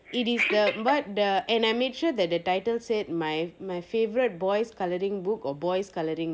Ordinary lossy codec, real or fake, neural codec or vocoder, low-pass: none; real; none; none